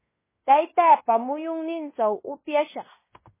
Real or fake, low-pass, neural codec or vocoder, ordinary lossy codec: fake; 3.6 kHz; codec, 16 kHz in and 24 kHz out, 0.9 kbps, LongCat-Audio-Codec, fine tuned four codebook decoder; MP3, 16 kbps